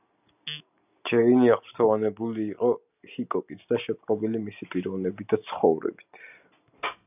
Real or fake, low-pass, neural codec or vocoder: real; 3.6 kHz; none